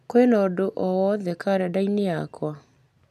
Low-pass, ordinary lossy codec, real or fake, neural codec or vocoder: 14.4 kHz; none; real; none